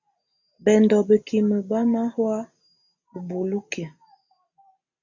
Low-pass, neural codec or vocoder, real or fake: 7.2 kHz; none; real